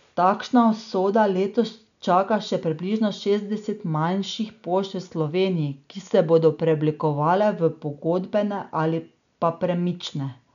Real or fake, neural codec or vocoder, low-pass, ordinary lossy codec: real; none; 7.2 kHz; none